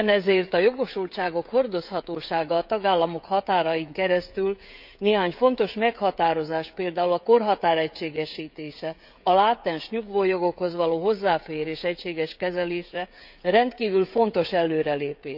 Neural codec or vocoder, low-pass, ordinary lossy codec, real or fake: codec, 16 kHz, 16 kbps, FreqCodec, smaller model; 5.4 kHz; none; fake